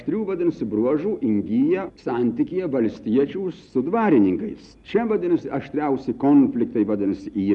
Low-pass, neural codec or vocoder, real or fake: 10.8 kHz; none; real